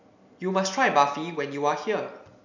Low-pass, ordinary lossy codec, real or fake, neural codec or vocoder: 7.2 kHz; none; real; none